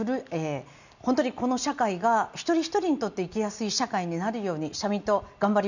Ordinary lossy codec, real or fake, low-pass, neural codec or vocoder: none; real; 7.2 kHz; none